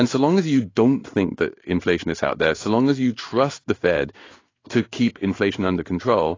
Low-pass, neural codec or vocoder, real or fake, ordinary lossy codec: 7.2 kHz; codec, 16 kHz in and 24 kHz out, 1 kbps, XY-Tokenizer; fake; AAC, 32 kbps